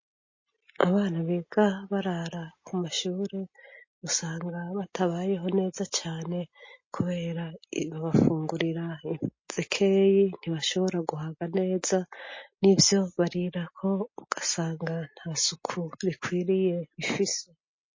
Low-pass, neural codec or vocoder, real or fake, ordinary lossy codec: 7.2 kHz; none; real; MP3, 32 kbps